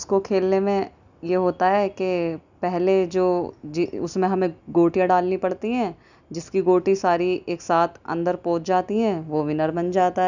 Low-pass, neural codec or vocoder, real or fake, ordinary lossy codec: 7.2 kHz; none; real; none